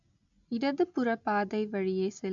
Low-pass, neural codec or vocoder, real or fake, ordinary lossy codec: 7.2 kHz; none; real; none